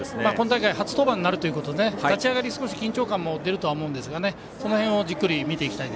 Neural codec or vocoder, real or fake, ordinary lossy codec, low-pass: none; real; none; none